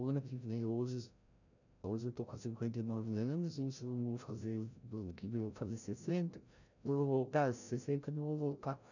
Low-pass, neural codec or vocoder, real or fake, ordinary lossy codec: 7.2 kHz; codec, 16 kHz, 0.5 kbps, FreqCodec, larger model; fake; none